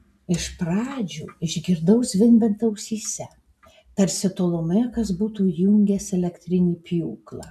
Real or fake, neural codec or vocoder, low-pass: real; none; 14.4 kHz